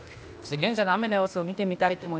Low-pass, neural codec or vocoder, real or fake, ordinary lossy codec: none; codec, 16 kHz, 0.8 kbps, ZipCodec; fake; none